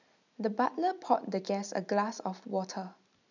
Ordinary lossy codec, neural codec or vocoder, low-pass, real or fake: none; none; 7.2 kHz; real